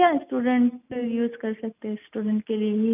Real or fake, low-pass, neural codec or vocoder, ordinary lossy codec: real; 3.6 kHz; none; none